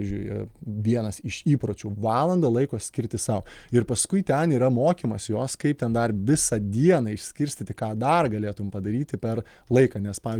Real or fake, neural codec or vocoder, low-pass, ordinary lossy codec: real; none; 19.8 kHz; Opus, 24 kbps